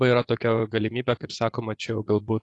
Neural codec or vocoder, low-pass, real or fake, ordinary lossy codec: vocoder, 24 kHz, 100 mel bands, Vocos; 10.8 kHz; fake; AAC, 48 kbps